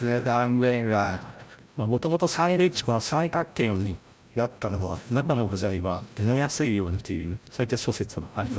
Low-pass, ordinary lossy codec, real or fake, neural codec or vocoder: none; none; fake; codec, 16 kHz, 0.5 kbps, FreqCodec, larger model